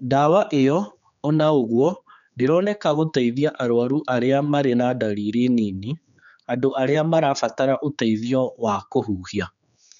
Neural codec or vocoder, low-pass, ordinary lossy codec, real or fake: codec, 16 kHz, 4 kbps, X-Codec, HuBERT features, trained on general audio; 7.2 kHz; none; fake